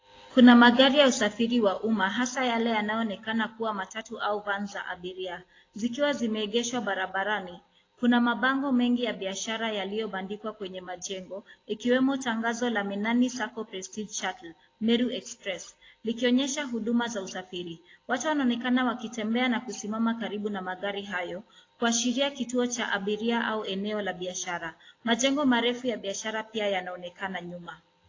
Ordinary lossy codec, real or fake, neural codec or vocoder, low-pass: AAC, 32 kbps; real; none; 7.2 kHz